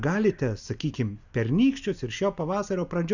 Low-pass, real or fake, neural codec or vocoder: 7.2 kHz; real; none